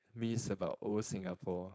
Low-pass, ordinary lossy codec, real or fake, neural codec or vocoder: none; none; fake; codec, 16 kHz, 4.8 kbps, FACodec